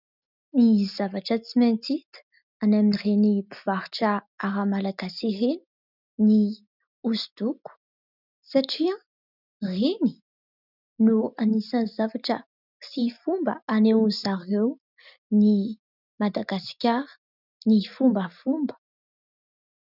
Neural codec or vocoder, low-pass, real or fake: none; 5.4 kHz; real